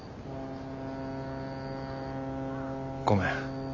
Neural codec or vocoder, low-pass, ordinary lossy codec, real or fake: none; 7.2 kHz; none; real